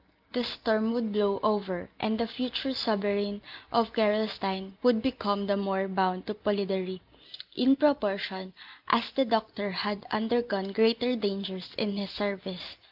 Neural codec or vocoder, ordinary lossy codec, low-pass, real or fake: none; Opus, 24 kbps; 5.4 kHz; real